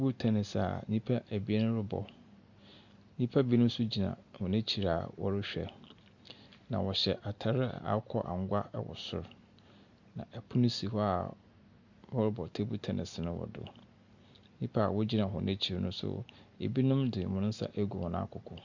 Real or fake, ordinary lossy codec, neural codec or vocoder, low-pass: real; Opus, 64 kbps; none; 7.2 kHz